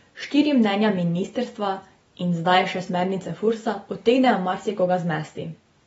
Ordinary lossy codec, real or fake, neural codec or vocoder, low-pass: AAC, 24 kbps; real; none; 19.8 kHz